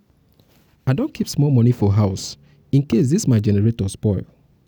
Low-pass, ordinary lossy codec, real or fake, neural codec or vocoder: none; none; real; none